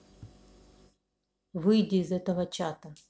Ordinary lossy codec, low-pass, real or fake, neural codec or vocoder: none; none; real; none